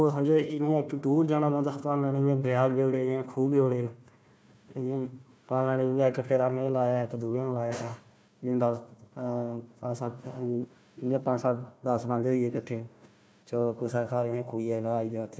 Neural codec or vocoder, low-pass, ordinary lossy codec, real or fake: codec, 16 kHz, 1 kbps, FunCodec, trained on Chinese and English, 50 frames a second; none; none; fake